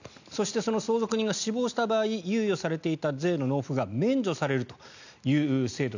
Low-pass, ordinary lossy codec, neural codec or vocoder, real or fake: 7.2 kHz; none; none; real